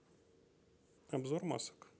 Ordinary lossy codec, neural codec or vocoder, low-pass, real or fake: none; none; none; real